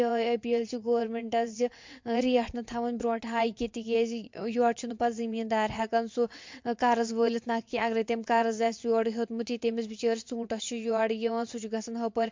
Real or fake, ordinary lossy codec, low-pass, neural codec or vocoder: fake; MP3, 48 kbps; 7.2 kHz; vocoder, 22.05 kHz, 80 mel bands, WaveNeXt